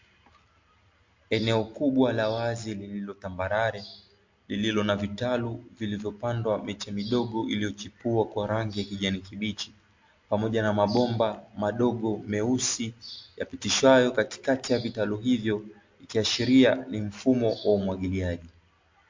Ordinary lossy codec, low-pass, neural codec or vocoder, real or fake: MP3, 48 kbps; 7.2 kHz; none; real